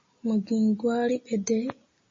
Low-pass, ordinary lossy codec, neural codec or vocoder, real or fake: 7.2 kHz; MP3, 32 kbps; none; real